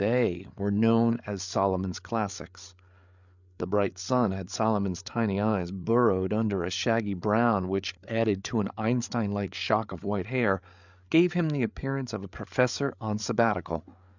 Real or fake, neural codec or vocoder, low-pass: fake; codec, 16 kHz, 8 kbps, FreqCodec, larger model; 7.2 kHz